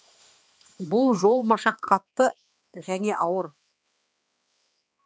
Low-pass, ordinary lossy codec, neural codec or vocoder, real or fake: none; none; codec, 16 kHz, 2 kbps, X-Codec, HuBERT features, trained on balanced general audio; fake